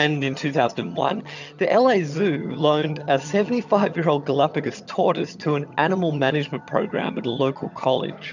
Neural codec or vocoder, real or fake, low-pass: vocoder, 22.05 kHz, 80 mel bands, HiFi-GAN; fake; 7.2 kHz